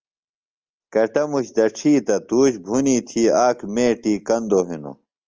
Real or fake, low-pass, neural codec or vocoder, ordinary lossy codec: real; 7.2 kHz; none; Opus, 24 kbps